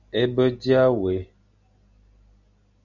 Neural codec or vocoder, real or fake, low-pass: none; real; 7.2 kHz